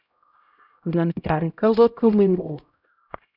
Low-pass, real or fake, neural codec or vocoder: 5.4 kHz; fake; codec, 16 kHz, 0.5 kbps, X-Codec, HuBERT features, trained on LibriSpeech